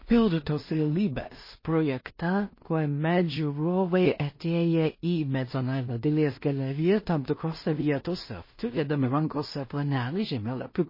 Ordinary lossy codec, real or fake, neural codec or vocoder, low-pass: MP3, 24 kbps; fake; codec, 16 kHz in and 24 kHz out, 0.4 kbps, LongCat-Audio-Codec, two codebook decoder; 5.4 kHz